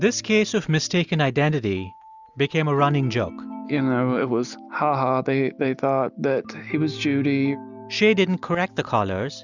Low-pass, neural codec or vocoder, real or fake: 7.2 kHz; none; real